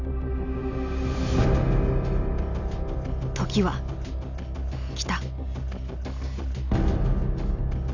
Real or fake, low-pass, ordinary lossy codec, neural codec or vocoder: real; 7.2 kHz; none; none